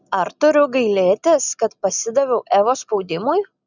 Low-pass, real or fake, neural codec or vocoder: 7.2 kHz; real; none